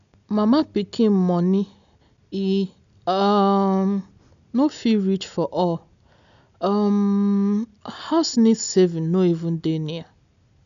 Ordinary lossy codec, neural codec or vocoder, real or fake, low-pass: none; none; real; 7.2 kHz